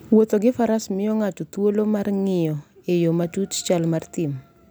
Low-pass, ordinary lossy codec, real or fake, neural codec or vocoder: none; none; real; none